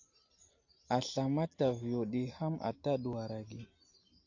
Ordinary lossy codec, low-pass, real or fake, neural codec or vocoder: AAC, 48 kbps; 7.2 kHz; real; none